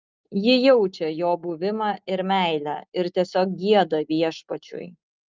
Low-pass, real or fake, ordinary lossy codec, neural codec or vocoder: 7.2 kHz; real; Opus, 24 kbps; none